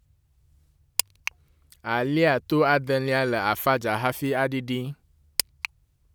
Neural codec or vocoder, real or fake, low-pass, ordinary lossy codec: none; real; none; none